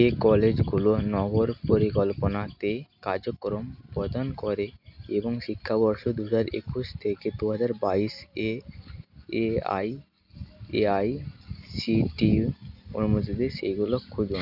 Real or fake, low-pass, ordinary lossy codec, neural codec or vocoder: real; 5.4 kHz; none; none